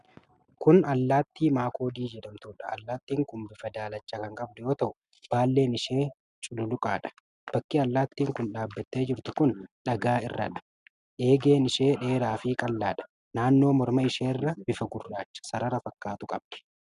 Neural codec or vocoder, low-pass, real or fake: none; 10.8 kHz; real